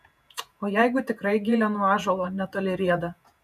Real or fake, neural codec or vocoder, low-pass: fake; vocoder, 48 kHz, 128 mel bands, Vocos; 14.4 kHz